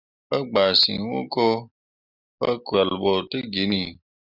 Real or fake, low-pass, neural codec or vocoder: real; 5.4 kHz; none